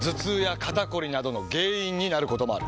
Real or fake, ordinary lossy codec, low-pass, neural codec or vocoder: real; none; none; none